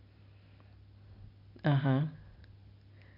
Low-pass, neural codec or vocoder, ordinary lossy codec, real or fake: 5.4 kHz; none; none; real